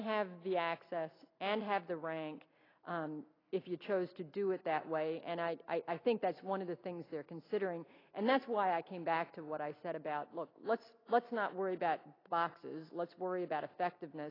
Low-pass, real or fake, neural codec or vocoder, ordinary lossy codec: 5.4 kHz; real; none; AAC, 24 kbps